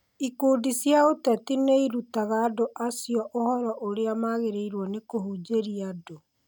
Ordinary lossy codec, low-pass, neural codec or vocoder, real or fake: none; none; none; real